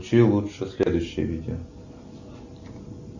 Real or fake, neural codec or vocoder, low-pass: real; none; 7.2 kHz